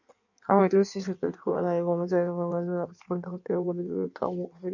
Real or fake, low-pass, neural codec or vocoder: fake; 7.2 kHz; codec, 16 kHz in and 24 kHz out, 1.1 kbps, FireRedTTS-2 codec